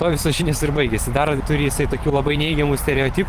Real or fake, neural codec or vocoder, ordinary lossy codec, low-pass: fake; vocoder, 44.1 kHz, 128 mel bands every 512 samples, BigVGAN v2; Opus, 24 kbps; 14.4 kHz